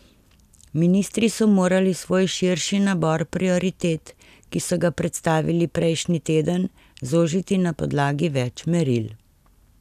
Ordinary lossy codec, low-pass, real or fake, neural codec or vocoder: none; 14.4 kHz; real; none